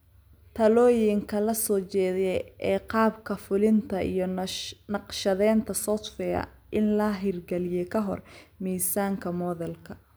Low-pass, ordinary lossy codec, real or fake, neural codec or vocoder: none; none; real; none